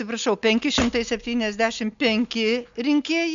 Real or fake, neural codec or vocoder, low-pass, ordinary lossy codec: real; none; 7.2 kHz; MP3, 64 kbps